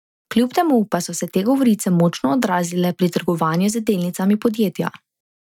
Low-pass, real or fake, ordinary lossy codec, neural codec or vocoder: 19.8 kHz; real; none; none